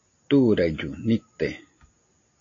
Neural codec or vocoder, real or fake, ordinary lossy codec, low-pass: none; real; AAC, 32 kbps; 7.2 kHz